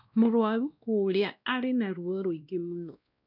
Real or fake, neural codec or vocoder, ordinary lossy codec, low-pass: fake; codec, 16 kHz, 1 kbps, X-Codec, WavLM features, trained on Multilingual LibriSpeech; none; 5.4 kHz